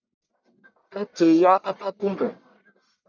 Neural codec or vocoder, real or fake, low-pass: codec, 44.1 kHz, 1.7 kbps, Pupu-Codec; fake; 7.2 kHz